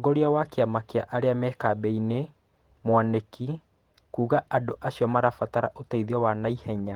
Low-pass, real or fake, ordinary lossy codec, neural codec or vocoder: 19.8 kHz; fake; Opus, 24 kbps; vocoder, 48 kHz, 128 mel bands, Vocos